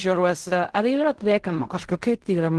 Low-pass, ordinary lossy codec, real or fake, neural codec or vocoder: 10.8 kHz; Opus, 16 kbps; fake; codec, 16 kHz in and 24 kHz out, 0.4 kbps, LongCat-Audio-Codec, fine tuned four codebook decoder